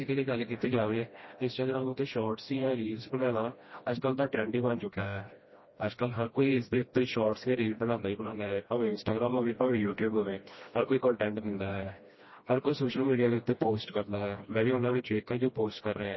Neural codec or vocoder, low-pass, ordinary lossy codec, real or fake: codec, 16 kHz, 1 kbps, FreqCodec, smaller model; 7.2 kHz; MP3, 24 kbps; fake